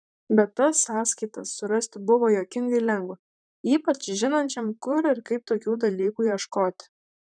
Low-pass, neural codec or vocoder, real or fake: 9.9 kHz; vocoder, 44.1 kHz, 128 mel bands, Pupu-Vocoder; fake